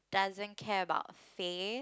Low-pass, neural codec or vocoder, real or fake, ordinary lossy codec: none; none; real; none